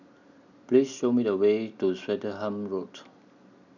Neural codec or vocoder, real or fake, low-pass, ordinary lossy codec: none; real; 7.2 kHz; none